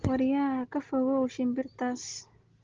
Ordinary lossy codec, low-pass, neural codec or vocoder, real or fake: Opus, 16 kbps; 7.2 kHz; none; real